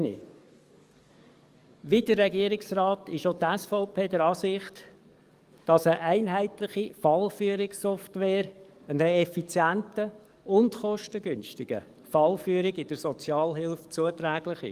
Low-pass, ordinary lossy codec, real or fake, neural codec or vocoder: 14.4 kHz; Opus, 32 kbps; fake; codec, 44.1 kHz, 7.8 kbps, DAC